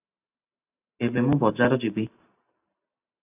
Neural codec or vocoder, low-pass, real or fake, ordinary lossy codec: none; 3.6 kHz; real; AAC, 32 kbps